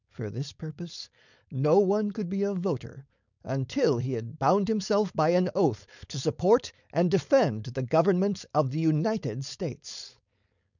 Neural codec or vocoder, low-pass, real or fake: codec, 16 kHz, 4.8 kbps, FACodec; 7.2 kHz; fake